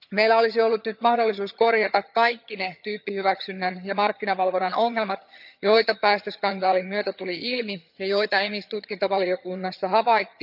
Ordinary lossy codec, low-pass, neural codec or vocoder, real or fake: none; 5.4 kHz; vocoder, 22.05 kHz, 80 mel bands, HiFi-GAN; fake